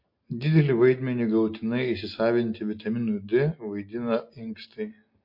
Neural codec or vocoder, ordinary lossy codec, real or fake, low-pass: none; MP3, 32 kbps; real; 5.4 kHz